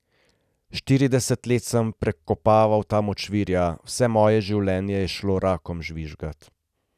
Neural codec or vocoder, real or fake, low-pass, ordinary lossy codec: none; real; 14.4 kHz; none